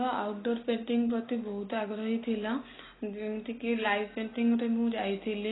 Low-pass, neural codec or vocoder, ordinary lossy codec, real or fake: 7.2 kHz; none; AAC, 16 kbps; real